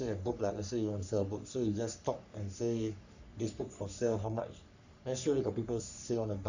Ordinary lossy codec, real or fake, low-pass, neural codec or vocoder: none; fake; 7.2 kHz; codec, 44.1 kHz, 3.4 kbps, Pupu-Codec